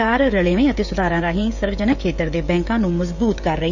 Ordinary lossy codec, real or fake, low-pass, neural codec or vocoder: none; fake; 7.2 kHz; codec, 16 kHz, 16 kbps, FreqCodec, smaller model